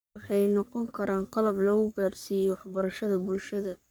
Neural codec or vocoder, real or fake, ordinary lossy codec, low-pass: codec, 44.1 kHz, 3.4 kbps, Pupu-Codec; fake; none; none